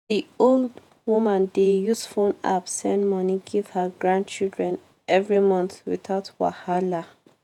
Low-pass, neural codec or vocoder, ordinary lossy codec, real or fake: 19.8 kHz; vocoder, 48 kHz, 128 mel bands, Vocos; none; fake